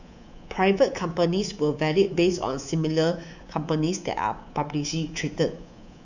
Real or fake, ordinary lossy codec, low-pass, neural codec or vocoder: fake; none; 7.2 kHz; codec, 24 kHz, 3.1 kbps, DualCodec